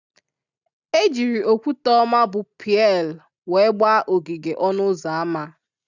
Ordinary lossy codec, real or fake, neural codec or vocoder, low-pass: none; real; none; 7.2 kHz